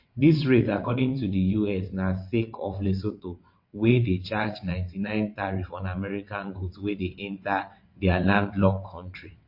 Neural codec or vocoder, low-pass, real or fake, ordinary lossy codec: vocoder, 22.05 kHz, 80 mel bands, WaveNeXt; 5.4 kHz; fake; MP3, 32 kbps